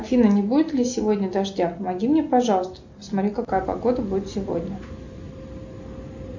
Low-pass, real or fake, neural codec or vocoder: 7.2 kHz; real; none